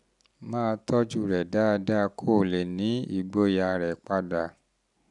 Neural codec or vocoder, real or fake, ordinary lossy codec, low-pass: none; real; none; 10.8 kHz